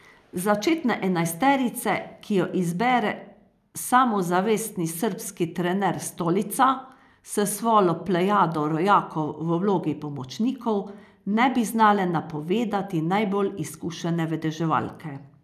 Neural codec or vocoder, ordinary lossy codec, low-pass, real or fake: none; none; 14.4 kHz; real